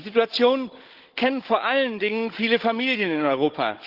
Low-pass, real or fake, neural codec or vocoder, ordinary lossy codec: 5.4 kHz; fake; codec, 16 kHz, 16 kbps, FunCodec, trained on Chinese and English, 50 frames a second; Opus, 16 kbps